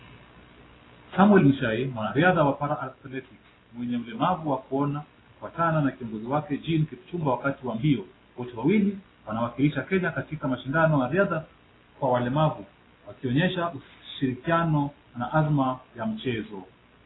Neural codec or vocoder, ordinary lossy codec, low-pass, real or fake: none; AAC, 16 kbps; 7.2 kHz; real